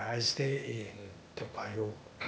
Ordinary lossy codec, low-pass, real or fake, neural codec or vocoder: none; none; fake; codec, 16 kHz, 0.8 kbps, ZipCodec